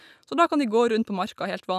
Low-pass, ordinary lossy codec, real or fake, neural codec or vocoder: 14.4 kHz; none; real; none